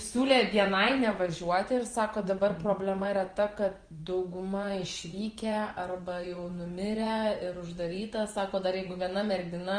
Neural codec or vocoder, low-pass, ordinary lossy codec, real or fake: vocoder, 24 kHz, 100 mel bands, Vocos; 9.9 kHz; Opus, 24 kbps; fake